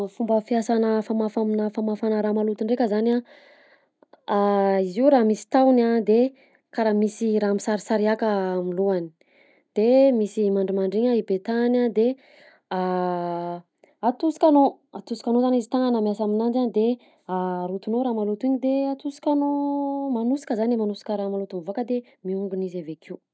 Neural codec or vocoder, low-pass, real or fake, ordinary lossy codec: none; none; real; none